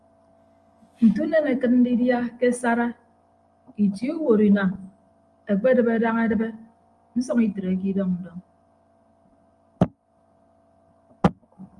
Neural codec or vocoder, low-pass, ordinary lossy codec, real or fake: none; 10.8 kHz; Opus, 32 kbps; real